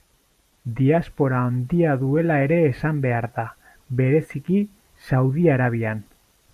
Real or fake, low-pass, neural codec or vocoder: fake; 14.4 kHz; vocoder, 44.1 kHz, 128 mel bands every 512 samples, BigVGAN v2